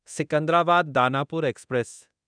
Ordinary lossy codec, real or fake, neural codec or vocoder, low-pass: none; fake; codec, 24 kHz, 0.9 kbps, DualCodec; 9.9 kHz